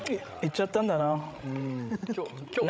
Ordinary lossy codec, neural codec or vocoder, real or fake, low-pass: none; codec, 16 kHz, 16 kbps, FreqCodec, larger model; fake; none